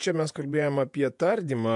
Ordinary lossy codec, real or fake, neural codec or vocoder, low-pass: MP3, 64 kbps; fake; vocoder, 48 kHz, 128 mel bands, Vocos; 10.8 kHz